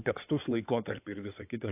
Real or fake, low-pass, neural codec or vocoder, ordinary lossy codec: fake; 3.6 kHz; codec, 16 kHz in and 24 kHz out, 2.2 kbps, FireRedTTS-2 codec; AAC, 32 kbps